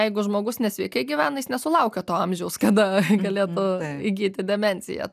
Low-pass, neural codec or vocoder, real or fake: 14.4 kHz; none; real